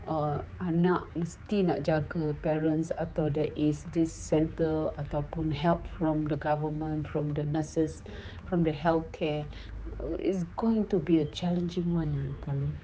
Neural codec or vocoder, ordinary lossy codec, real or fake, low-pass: codec, 16 kHz, 4 kbps, X-Codec, HuBERT features, trained on general audio; none; fake; none